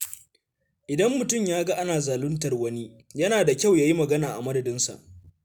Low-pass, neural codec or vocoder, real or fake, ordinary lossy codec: none; none; real; none